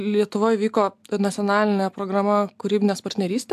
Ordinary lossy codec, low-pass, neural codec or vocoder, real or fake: AAC, 96 kbps; 14.4 kHz; none; real